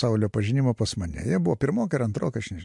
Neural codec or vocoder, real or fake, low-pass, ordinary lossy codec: none; real; 10.8 kHz; MP3, 64 kbps